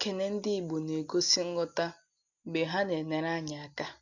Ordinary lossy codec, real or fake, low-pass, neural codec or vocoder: none; real; 7.2 kHz; none